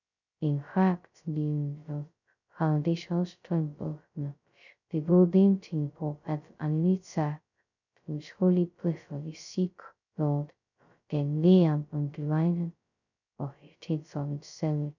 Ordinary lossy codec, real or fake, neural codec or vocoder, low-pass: none; fake; codec, 16 kHz, 0.2 kbps, FocalCodec; 7.2 kHz